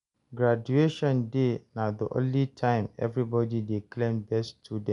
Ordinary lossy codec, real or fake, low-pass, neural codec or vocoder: none; real; 10.8 kHz; none